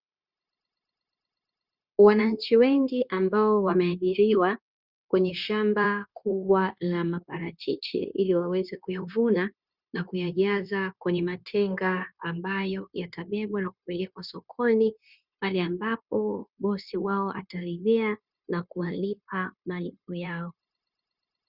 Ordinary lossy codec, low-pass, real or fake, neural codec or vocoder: Opus, 64 kbps; 5.4 kHz; fake; codec, 16 kHz, 0.9 kbps, LongCat-Audio-Codec